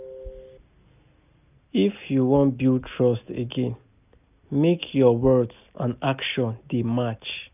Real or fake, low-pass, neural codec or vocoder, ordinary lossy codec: real; 3.6 kHz; none; none